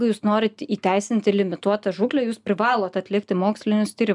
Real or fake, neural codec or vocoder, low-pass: real; none; 10.8 kHz